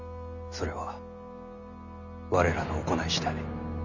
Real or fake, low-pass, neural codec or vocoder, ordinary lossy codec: real; 7.2 kHz; none; none